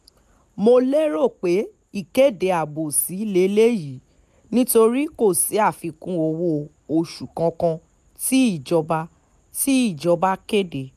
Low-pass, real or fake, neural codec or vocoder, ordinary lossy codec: 14.4 kHz; real; none; MP3, 96 kbps